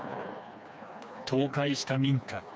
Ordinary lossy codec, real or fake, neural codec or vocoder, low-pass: none; fake; codec, 16 kHz, 2 kbps, FreqCodec, smaller model; none